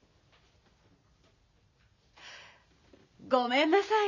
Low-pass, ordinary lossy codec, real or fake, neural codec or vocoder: 7.2 kHz; none; real; none